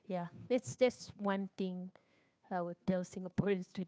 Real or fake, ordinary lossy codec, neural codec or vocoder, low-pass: fake; none; codec, 16 kHz, 2 kbps, FunCodec, trained on Chinese and English, 25 frames a second; none